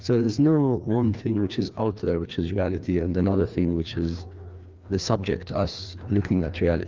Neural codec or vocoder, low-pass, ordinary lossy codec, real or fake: codec, 16 kHz, 2 kbps, FreqCodec, larger model; 7.2 kHz; Opus, 24 kbps; fake